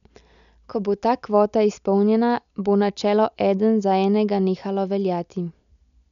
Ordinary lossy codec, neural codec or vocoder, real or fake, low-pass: none; none; real; 7.2 kHz